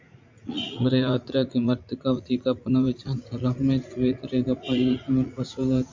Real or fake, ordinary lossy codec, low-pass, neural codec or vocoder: fake; MP3, 64 kbps; 7.2 kHz; vocoder, 44.1 kHz, 80 mel bands, Vocos